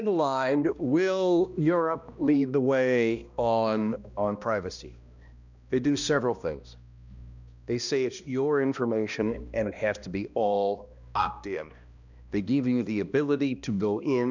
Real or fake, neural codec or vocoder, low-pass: fake; codec, 16 kHz, 1 kbps, X-Codec, HuBERT features, trained on balanced general audio; 7.2 kHz